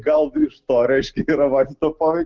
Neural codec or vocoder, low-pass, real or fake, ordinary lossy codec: none; 7.2 kHz; real; Opus, 16 kbps